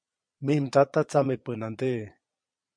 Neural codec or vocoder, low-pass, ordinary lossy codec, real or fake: vocoder, 22.05 kHz, 80 mel bands, Vocos; 9.9 kHz; MP3, 64 kbps; fake